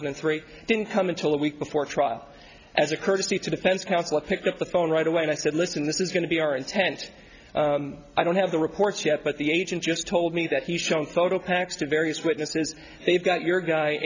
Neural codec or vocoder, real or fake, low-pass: none; real; 7.2 kHz